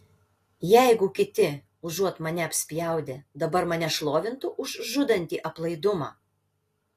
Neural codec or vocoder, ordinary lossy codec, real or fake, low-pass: none; AAC, 48 kbps; real; 14.4 kHz